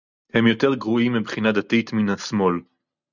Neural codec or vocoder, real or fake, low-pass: none; real; 7.2 kHz